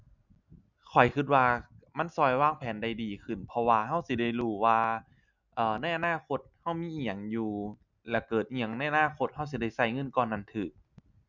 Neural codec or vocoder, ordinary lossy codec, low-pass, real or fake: none; none; 7.2 kHz; real